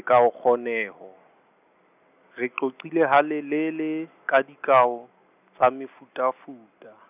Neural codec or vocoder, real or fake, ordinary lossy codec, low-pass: none; real; none; 3.6 kHz